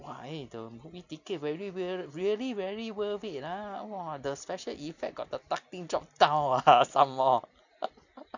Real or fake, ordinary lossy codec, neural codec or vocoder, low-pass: real; none; none; 7.2 kHz